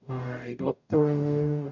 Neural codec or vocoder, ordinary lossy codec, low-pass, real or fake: codec, 44.1 kHz, 0.9 kbps, DAC; none; 7.2 kHz; fake